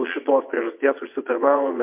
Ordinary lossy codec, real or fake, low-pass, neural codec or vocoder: MP3, 32 kbps; fake; 3.6 kHz; vocoder, 22.05 kHz, 80 mel bands, WaveNeXt